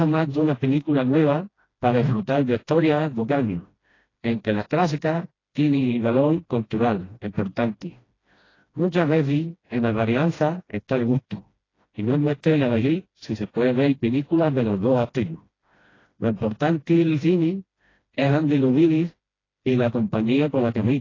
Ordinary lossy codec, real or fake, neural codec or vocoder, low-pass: AAC, 32 kbps; fake; codec, 16 kHz, 1 kbps, FreqCodec, smaller model; 7.2 kHz